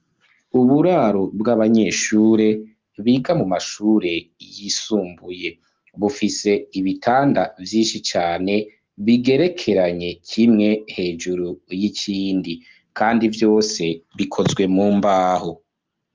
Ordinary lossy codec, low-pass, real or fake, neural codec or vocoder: Opus, 24 kbps; 7.2 kHz; real; none